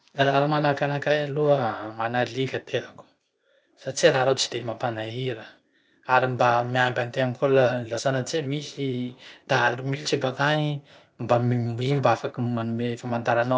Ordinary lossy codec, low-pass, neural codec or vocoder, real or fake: none; none; codec, 16 kHz, 0.8 kbps, ZipCodec; fake